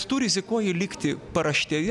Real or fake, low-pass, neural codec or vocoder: real; 10.8 kHz; none